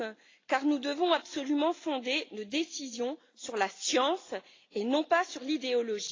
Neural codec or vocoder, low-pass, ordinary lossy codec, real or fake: none; 7.2 kHz; AAC, 32 kbps; real